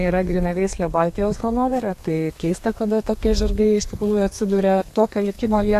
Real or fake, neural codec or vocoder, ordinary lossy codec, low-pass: fake; codec, 32 kHz, 1.9 kbps, SNAC; AAC, 64 kbps; 14.4 kHz